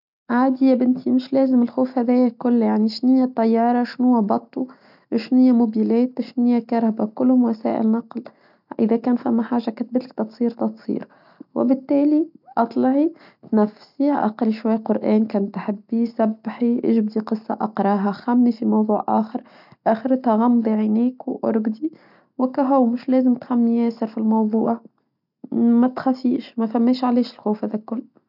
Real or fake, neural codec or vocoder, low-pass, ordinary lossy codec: real; none; 5.4 kHz; none